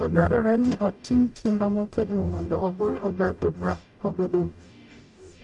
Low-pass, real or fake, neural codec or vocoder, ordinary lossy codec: 10.8 kHz; fake; codec, 44.1 kHz, 0.9 kbps, DAC; none